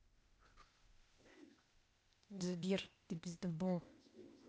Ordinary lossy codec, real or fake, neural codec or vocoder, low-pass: none; fake; codec, 16 kHz, 0.8 kbps, ZipCodec; none